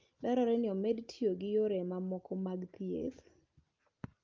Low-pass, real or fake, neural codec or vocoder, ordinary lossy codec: 7.2 kHz; real; none; Opus, 24 kbps